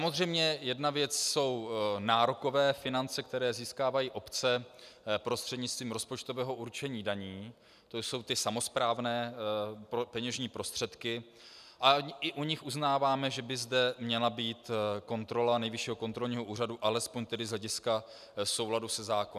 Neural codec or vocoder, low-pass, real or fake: none; 14.4 kHz; real